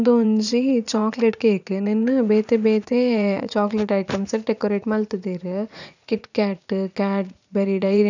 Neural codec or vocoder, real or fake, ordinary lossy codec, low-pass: vocoder, 44.1 kHz, 80 mel bands, Vocos; fake; none; 7.2 kHz